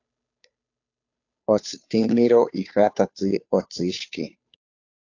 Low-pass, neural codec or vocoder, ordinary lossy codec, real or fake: 7.2 kHz; codec, 16 kHz, 2 kbps, FunCodec, trained on Chinese and English, 25 frames a second; AAC, 48 kbps; fake